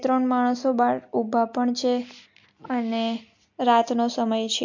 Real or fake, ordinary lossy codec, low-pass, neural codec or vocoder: real; MP3, 48 kbps; 7.2 kHz; none